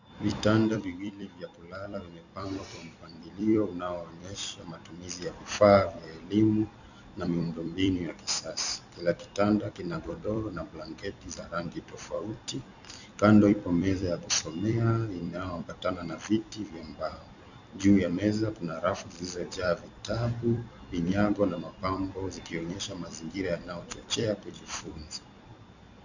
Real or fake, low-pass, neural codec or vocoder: fake; 7.2 kHz; vocoder, 44.1 kHz, 128 mel bands every 256 samples, BigVGAN v2